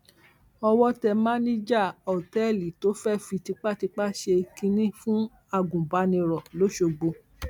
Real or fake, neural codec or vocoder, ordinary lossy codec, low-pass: real; none; none; 19.8 kHz